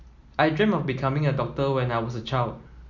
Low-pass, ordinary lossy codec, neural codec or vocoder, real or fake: 7.2 kHz; Opus, 64 kbps; none; real